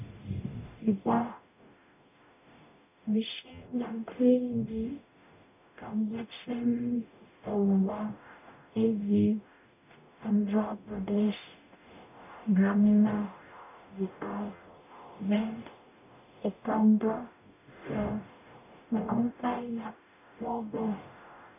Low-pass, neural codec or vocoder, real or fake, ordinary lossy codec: 3.6 kHz; codec, 44.1 kHz, 0.9 kbps, DAC; fake; none